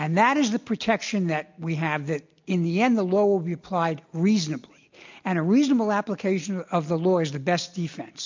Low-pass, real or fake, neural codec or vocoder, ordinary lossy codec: 7.2 kHz; real; none; MP3, 64 kbps